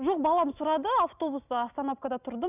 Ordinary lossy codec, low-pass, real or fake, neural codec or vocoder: none; 3.6 kHz; real; none